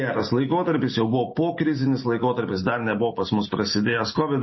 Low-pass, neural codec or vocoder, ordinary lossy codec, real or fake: 7.2 kHz; none; MP3, 24 kbps; real